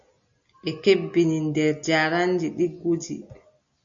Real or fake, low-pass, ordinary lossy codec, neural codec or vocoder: real; 7.2 kHz; AAC, 64 kbps; none